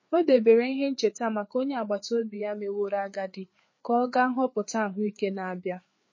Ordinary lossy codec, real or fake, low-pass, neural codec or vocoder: MP3, 32 kbps; fake; 7.2 kHz; codec, 16 kHz, 4 kbps, FreqCodec, larger model